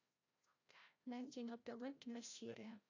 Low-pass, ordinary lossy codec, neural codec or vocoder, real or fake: 7.2 kHz; AAC, 48 kbps; codec, 16 kHz, 0.5 kbps, FreqCodec, larger model; fake